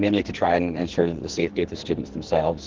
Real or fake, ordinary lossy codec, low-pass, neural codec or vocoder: fake; Opus, 16 kbps; 7.2 kHz; codec, 44.1 kHz, 2.6 kbps, SNAC